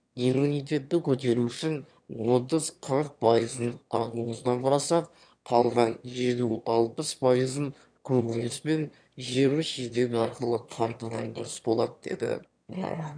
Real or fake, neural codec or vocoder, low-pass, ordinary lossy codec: fake; autoencoder, 22.05 kHz, a latent of 192 numbers a frame, VITS, trained on one speaker; 9.9 kHz; none